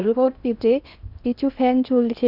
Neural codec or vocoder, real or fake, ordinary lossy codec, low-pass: codec, 16 kHz in and 24 kHz out, 0.8 kbps, FocalCodec, streaming, 65536 codes; fake; none; 5.4 kHz